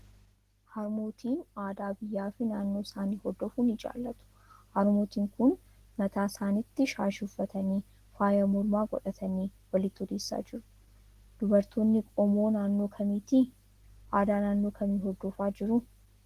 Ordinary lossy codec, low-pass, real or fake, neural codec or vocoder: Opus, 16 kbps; 14.4 kHz; real; none